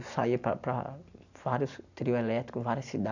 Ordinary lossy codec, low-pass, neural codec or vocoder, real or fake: none; 7.2 kHz; none; real